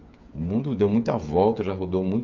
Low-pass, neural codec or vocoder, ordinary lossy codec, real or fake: 7.2 kHz; codec, 16 kHz, 8 kbps, FreqCodec, smaller model; Opus, 64 kbps; fake